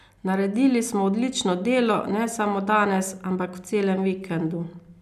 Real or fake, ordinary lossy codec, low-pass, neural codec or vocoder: real; none; 14.4 kHz; none